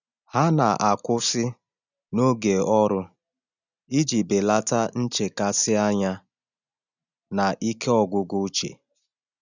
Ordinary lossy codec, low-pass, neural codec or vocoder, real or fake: none; 7.2 kHz; none; real